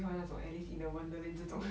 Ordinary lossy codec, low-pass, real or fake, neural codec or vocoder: none; none; real; none